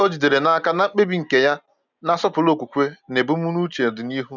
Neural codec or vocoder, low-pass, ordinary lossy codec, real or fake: none; 7.2 kHz; none; real